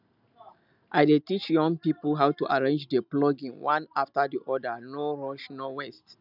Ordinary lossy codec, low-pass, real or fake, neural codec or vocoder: none; 5.4 kHz; real; none